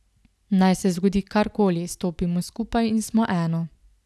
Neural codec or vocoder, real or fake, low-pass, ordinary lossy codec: none; real; none; none